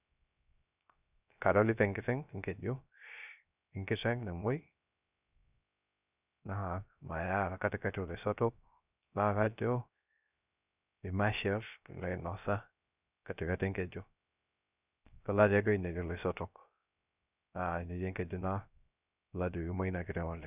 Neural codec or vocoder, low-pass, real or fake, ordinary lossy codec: codec, 16 kHz, 0.3 kbps, FocalCodec; 3.6 kHz; fake; none